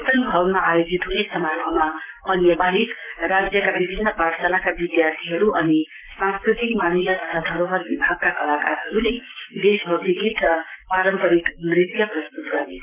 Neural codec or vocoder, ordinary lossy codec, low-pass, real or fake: codec, 44.1 kHz, 7.8 kbps, Pupu-Codec; none; 3.6 kHz; fake